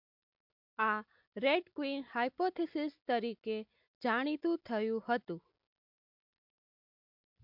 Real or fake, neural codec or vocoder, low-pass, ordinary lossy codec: real; none; 5.4 kHz; MP3, 48 kbps